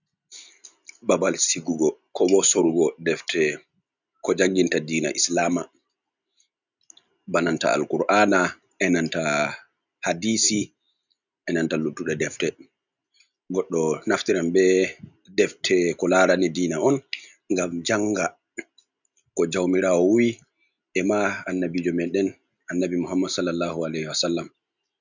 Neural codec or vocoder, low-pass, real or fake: none; 7.2 kHz; real